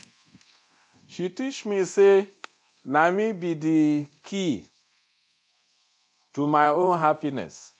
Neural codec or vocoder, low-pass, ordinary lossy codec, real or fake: codec, 24 kHz, 0.9 kbps, DualCodec; 10.8 kHz; none; fake